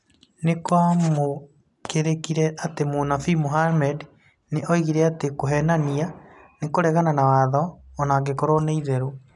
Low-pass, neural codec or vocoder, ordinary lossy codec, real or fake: 10.8 kHz; none; none; real